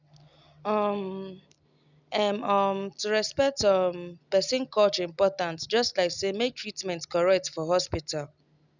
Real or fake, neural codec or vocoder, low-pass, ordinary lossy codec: real; none; 7.2 kHz; none